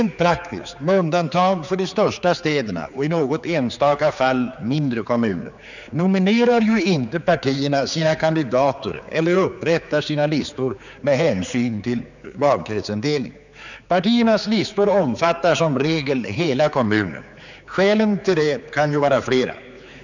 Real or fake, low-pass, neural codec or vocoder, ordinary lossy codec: fake; 7.2 kHz; codec, 16 kHz, 4 kbps, X-Codec, HuBERT features, trained on general audio; none